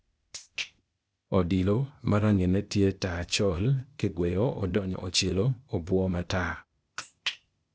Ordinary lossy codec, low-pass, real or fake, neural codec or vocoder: none; none; fake; codec, 16 kHz, 0.8 kbps, ZipCodec